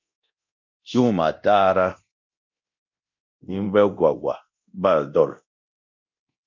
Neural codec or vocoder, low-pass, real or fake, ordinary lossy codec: codec, 24 kHz, 0.9 kbps, DualCodec; 7.2 kHz; fake; MP3, 64 kbps